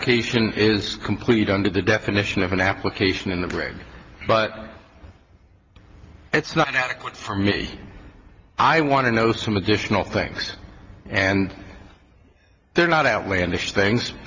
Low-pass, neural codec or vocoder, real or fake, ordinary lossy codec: 7.2 kHz; none; real; Opus, 32 kbps